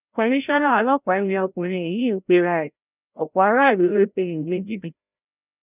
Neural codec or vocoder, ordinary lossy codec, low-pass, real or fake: codec, 16 kHz, 0.5 kbps, FreqCodec, larger model; none; 3.6 kHz; fake